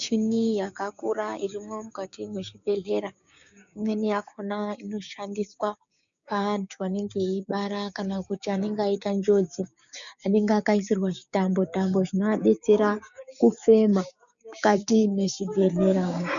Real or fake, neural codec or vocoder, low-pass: fake; codec, 16 kHz, 6 kbps, DAC; 7.2 kHz